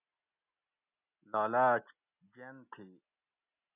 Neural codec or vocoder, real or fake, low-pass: none; real; 3.6 kHz